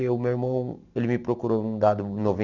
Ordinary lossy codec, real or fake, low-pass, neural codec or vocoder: none; real; 7.2 kHz; none